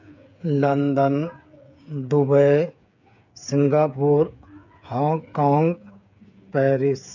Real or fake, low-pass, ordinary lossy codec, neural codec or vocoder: fake; 7.2 kHz; none; codec, 16 kHz, 8 kbps, FreqCodec, smaller model